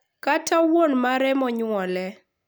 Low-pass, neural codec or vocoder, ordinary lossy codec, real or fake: none; none; none; real